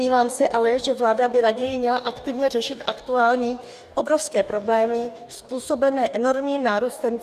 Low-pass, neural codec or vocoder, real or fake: 14.4 kHz; codec, 44.1 kHz, 2.6 kbps, DAC; fake